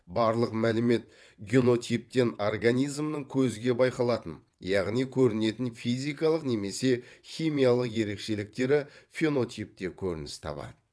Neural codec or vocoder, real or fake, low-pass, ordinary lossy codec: vocoder, 22.05 kHz, 80 mel bands, WaveNeXt; fake; none; none